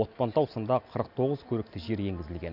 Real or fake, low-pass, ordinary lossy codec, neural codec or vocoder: real; 5.4 kHz; none; none